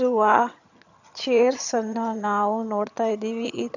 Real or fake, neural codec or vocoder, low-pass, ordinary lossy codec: fake; vocoder, 22.05 kHz, 80 mel bands, HiFi-GAN; 7.2 kHz; none